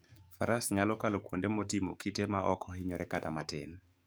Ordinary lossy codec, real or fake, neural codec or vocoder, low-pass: none; fake; codec, 44.1 kHz, 7.8 kbps, DAC; none